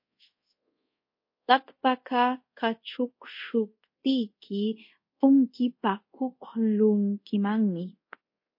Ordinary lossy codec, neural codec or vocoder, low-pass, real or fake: MP3, 32 kbps; codec, 24 kHz, 0.5 kbps, DualCodec; 5.4 kHz; fake